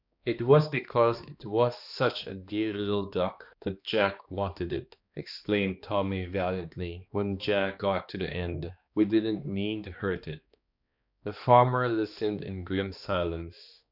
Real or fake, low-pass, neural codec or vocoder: fake; 5.4 kHz; codec, 16 kHz, 2 kbps, X-Codec, HuBERT features, trained on balanced general audio